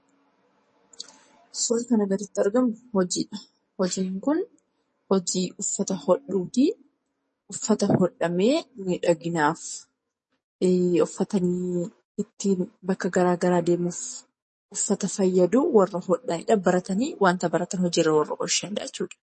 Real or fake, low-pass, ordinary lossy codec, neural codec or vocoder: fake; 10.8 kHz; MP3, 32 kbps; codec, 44.1 kHz, 7.8 kbps, DAC